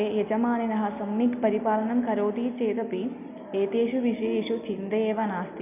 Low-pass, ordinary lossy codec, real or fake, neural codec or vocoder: 3.6 kHz; none; real; none